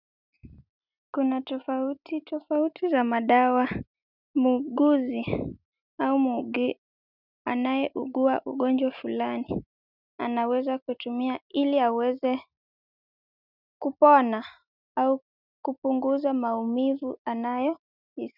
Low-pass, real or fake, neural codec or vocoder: 5.4 kHz; real; none